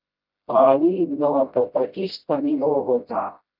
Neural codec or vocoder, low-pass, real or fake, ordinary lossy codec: codec, 16 kHz, 0.5 kbps, FreqCodec, smaller model; 5.4 kHz; fake; Opus, 24 kbps